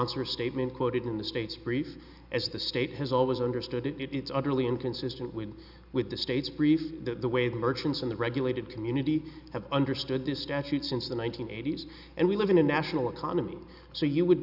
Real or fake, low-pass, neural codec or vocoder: real; 5.4 kHz; none